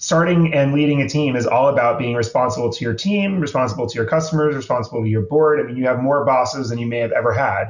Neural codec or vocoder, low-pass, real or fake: none; 7.2 kHz; real